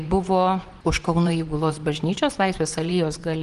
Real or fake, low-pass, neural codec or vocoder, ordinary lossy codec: fake; 10.8 kHz; vocoder, 24 kHz, 100 mel bands, Vocos; Opus, 24 kbps